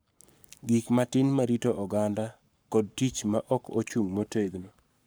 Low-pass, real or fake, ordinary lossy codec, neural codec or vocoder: none; fake; none; codec, 44.1 kHz, 7.8 kbps, Pupu-Codec